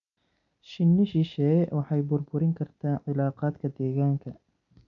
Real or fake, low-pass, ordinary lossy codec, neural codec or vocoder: real; 7.2 kHz; none; none